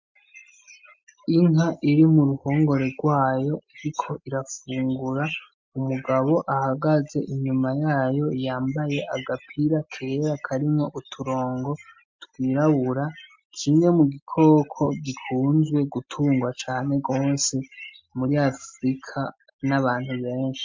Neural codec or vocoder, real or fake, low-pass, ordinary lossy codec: none; real; 7.2 kHz; MP3, 48 kbps